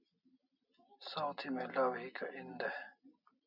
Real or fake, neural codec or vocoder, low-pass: real; none; 5.4 kHz